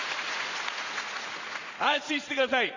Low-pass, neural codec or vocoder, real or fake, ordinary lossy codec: 7.2 kHz; vocoder, 44.1 kHz, 80 mel bands, Vocos; fake; Opus, 64 kbps